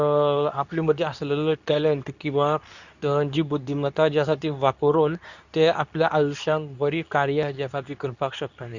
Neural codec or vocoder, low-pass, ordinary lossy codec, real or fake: codec, 24 kHz, 0.9 kbps, WavTokenizer, medium speech release version 2; 7.2 kHz; none; fake